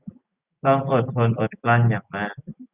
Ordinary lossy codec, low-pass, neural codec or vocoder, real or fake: Opus, 64 kbps; 3.6 kHz; none; real